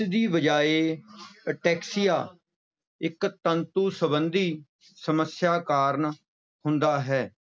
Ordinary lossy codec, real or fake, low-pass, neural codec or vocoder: none; real; none; none